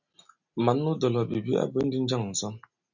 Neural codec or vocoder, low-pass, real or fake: none; 7.2 kHz; real